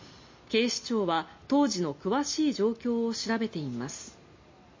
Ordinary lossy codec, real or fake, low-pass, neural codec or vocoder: MP3, 32 kbps; real; 7.2 kHz; none